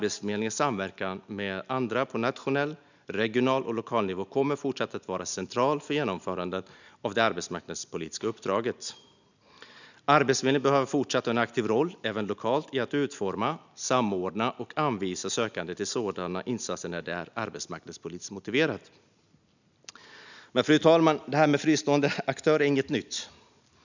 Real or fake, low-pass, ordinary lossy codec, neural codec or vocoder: real; 7.2 kHz; none; none